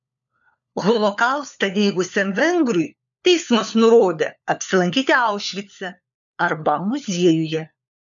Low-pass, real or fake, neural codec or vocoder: 7.2 kHz; fake; codec, 16 kHz, 4 kbps, FunCodec, trained on LibriTTS, 50 frames a second